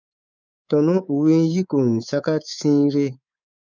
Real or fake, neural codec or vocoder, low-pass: fake; codec, 24 kHz, 3.1 kbps, DualCodec; 7.2 kHz